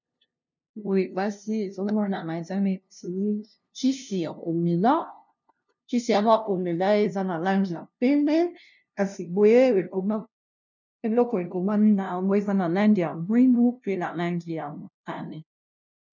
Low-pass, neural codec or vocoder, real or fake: 7.2 kHz; codec, 16 kHz, 0.5 kbps, FunCodec, trained on LibriTTS, 25 frames a second; fake